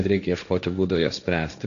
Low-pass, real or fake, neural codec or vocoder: 7.2 kHz; fake; codec, 16 kHz, 1.1 kbps, Voila-Tokenizer